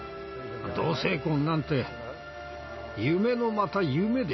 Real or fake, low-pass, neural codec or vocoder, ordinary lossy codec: real; 7.2 kHz; none; MP3, 24 kbps